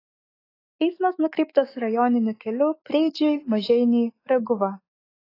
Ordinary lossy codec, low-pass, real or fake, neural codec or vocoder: AAC, 24 kbps; 5.4 kHz; fake; autoencoder, 48 kHz, 128 numbers a frame, DAC-VAE, trained on Japanese speech